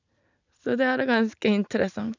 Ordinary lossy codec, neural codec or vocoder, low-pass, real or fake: none; none; 7.2 kHz; real